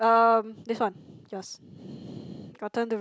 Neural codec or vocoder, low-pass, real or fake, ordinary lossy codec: none; none; real; none